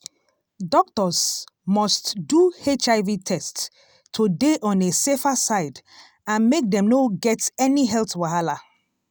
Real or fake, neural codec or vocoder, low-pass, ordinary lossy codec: real; none; none; none